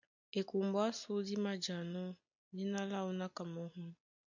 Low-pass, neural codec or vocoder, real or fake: 7.2 kHz; none; real